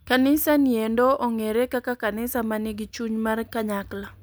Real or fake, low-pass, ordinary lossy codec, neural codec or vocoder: real; none; none; none